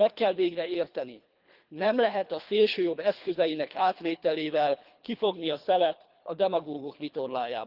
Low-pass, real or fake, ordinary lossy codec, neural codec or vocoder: 5.4 kHz; fake; Opus, 32 kbps; codec, 24 kHz, 3 kbps, HILCodec